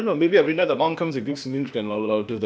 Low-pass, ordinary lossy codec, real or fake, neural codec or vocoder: none; none; fake; codec, 16 kHz, 0.8 kbps, ZipCodec